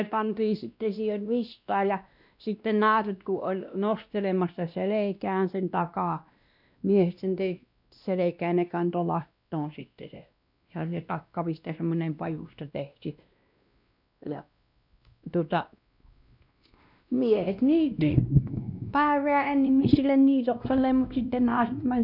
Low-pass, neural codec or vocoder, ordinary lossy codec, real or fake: 5.4 kHz; codec, 16 kHz, 1 kbps, X-Codec, WavLM features, trained on Multilingual LibriSpeech; none; fake